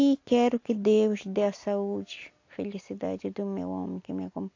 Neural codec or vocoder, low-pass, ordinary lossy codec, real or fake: none; 7.2 kHz; AAC, 48 kbps; real